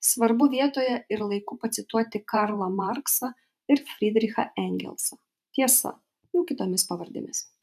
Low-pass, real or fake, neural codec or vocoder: 14.4 kHz; fake; vocoder, 44.1 kHz, 128 mel bands every 512 samples, BigVGAN v2